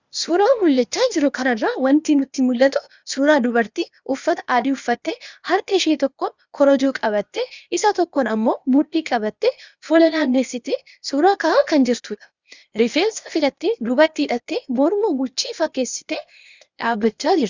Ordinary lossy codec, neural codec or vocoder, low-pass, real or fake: Opus, 64 kbps; codec, 16 kHz, 0.8 kbps, ZipCodec; 7.2 kHz; fake